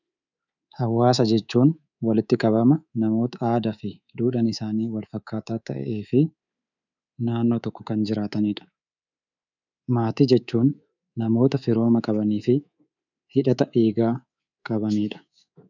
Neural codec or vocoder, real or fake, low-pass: codec, 24 kHz, 3.1 kbps, DualCodec; fake; 7.2 kHz